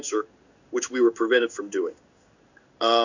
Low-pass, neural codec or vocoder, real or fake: 7.2 kHz; codec, 16 kHz in and 24 kHz out, 1 kbps, XY-Tokenizer; fake